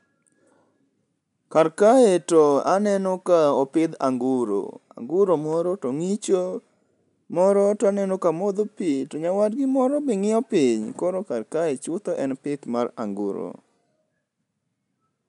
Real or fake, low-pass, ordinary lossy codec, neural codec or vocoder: real; 10.8 kHz; none; none